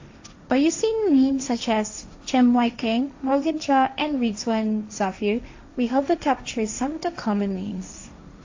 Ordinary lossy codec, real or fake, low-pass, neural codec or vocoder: AAC, 48 kbps; fake; 7.2 kHz; codec, 16 kHz, 1.1 kbps, Voila-Tokenizer